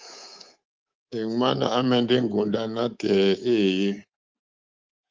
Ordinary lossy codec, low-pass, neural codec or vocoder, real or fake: Opus, 24 kbps; 7.2 kHz; codec, 24 kHz, 3.1 kbps, DualCodec; fake